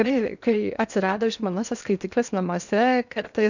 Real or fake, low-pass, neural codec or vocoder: fake; 7.2 kHz; codec, 16 kHz in and 24 kHz out, 0.8 kbps, FocalCodec, streaming, 65536 codes